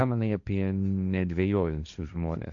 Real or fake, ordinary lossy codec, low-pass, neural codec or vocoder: fake; MP3, 96 kbps; 7.2 kHz; codec, 16 kHz, 1.1 kbps, Voila-Tokenizer